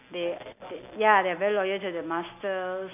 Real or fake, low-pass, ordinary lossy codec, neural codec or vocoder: real; 3.6 kHz; none; none